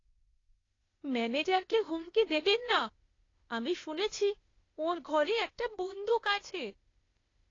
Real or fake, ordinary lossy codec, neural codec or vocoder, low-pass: fake; AAC, 32 kbps; codec, 16 kHz, 0.8 kbps, ZipCodec; 7.2 kHz